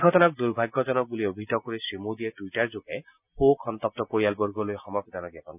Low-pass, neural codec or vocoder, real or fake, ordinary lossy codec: 3.6 kHz; none; real; none